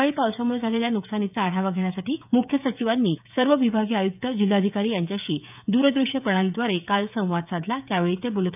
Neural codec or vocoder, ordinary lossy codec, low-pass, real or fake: codec, 16 kHz, 16 kbps, FreqCodec, smaller model; none; 3.6 kHz; fake